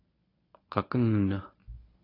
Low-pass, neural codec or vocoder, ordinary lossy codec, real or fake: 5.4 kHz; codec, 24 kHz, 0.9 kbps, WavTokenizer, medium speech release version 1; AAC, 24 kbps; fake